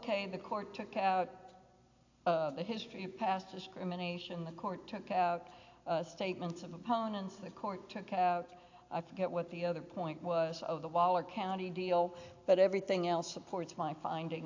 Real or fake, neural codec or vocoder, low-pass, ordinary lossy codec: real; none; 7.2 kHz; AAC, 48 kbps